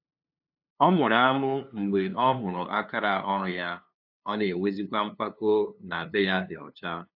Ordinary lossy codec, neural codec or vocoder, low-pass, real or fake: AAC, 48 kbps; codec, 16 kHz, 2 kbps, FunCodec, trained on LibriTTS, 25 frames a second; 5.4 kHz; fake